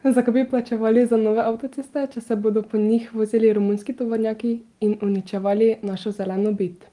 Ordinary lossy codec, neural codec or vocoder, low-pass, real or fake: Opus, 24 kbps; none; 10.8 kHz; real